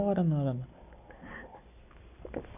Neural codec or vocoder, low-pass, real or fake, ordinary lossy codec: none; 3.6 kHz; real; none